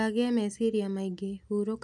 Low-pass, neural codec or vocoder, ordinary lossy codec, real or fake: none; none; none; real